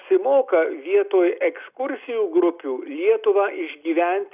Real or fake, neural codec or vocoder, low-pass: real; none; 3.6 kHz